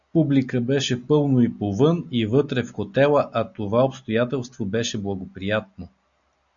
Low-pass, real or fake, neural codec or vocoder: 7.2 kHz; real; none